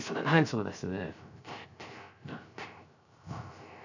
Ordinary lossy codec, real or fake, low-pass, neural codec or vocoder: none; fake; 7.2 kHz; codec, 16 kHz, 0.3 kbps, FocalCodec